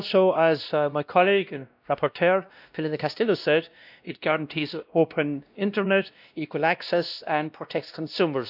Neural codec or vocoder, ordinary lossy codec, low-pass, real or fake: codec, 16 kHz, 1 kbps, X-Codec, WavLM features, trained on Multilingual LibriSpeech; none; 5.4 kHz; fake